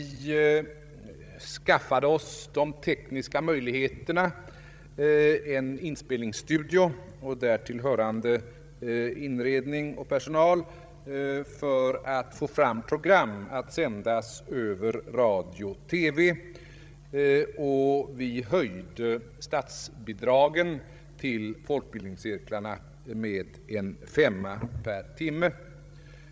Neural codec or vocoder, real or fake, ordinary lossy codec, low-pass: codec, 16 kHz, 16 kbps, FreqCodec, larger model; fake; none; none